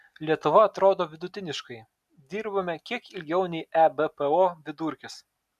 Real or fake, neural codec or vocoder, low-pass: real; none; 14.4 kHz